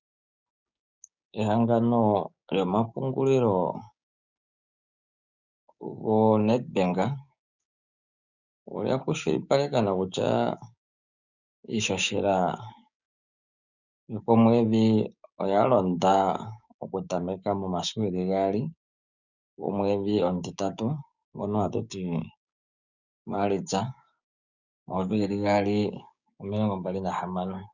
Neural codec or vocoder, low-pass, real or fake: codec, 16 kHz, 6 kbps, DAC; 7.2 kHz; fake